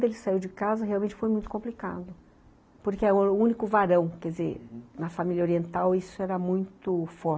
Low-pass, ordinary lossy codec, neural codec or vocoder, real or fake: none; none; none; real